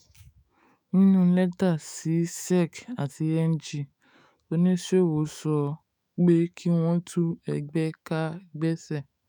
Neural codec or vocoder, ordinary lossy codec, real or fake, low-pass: autoencoder, 48 kHz, 128 numbers a frame, DAC-VAE, trained on Japanese speech; none; fake; none